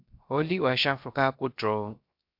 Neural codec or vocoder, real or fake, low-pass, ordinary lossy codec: codec, 16 kHz, 0.3 kbps, FocalCodec; fake; 5.4 kHz; MP3, 48 kbps